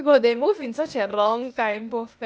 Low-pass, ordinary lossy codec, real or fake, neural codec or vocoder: none; none; fake; codec, 16 kHz, 0.8 kbps, ZipCodec